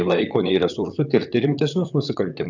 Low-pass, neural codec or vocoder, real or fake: 7.2 kHz; vocoder, 44.1 kHz, 128 mel bands, Pupu-Vocoder; fake